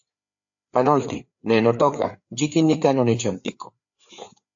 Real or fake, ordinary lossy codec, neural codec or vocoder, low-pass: fake; AAC, 64 kbps; codec, 16 kHz, 4 kbps, FreqCodec, larger model; 7.2 kHz